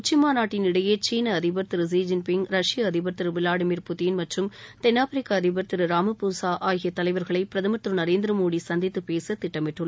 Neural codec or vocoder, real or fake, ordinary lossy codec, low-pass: none; real; none; none